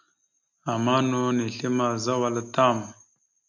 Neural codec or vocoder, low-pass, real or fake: none; 7.2 kHz; real